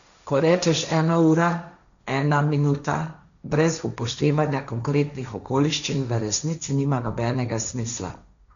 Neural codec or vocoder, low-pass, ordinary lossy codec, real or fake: codec, 16 kHz, 1.1 kbps, Voila-Tokenizer; 7.2 kHz; none; fake